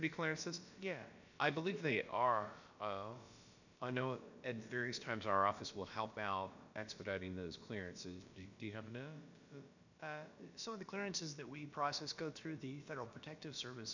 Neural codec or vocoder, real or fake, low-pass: codec, 16 kHz, about 1 kbps, DyCAST, with the encoder's durations; fake; 7.2 kHz